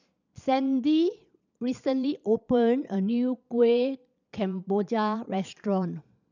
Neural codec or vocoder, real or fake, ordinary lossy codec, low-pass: vocoder, 22.05 kHz, 80 mel bands, Vocos; fake; none; 7.2 kHz